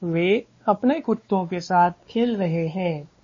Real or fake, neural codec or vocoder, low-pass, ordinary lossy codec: fake; codec, 16 kHz, 2 kbps, X-Codec, WavLM features, trained on Multilingual LibriSpeech; 7.2 kHz; MP3, 32 kbps